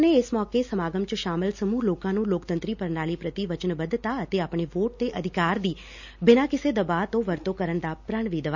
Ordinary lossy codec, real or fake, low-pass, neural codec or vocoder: none; real; 7.2 kHz; none